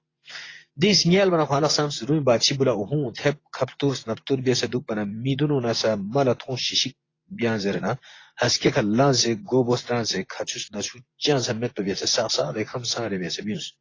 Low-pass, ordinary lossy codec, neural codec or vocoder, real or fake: 7.2 kHz; AAC, 32 kbps; vocoder, 24 kHz, 100 mel bands, Vocos; fake